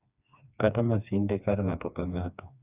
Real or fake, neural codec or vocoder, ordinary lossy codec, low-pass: fake; codec, 16 kHz, 2 kbps, FreqCodec, smaller model; none; 3.6 kHz